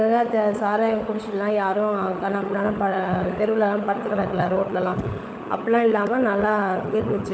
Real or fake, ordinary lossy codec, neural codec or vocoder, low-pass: fake; none; codec, 16 kHz, 16 kbps, FunCodec, trained on LibriTTS, 50 frames a second; none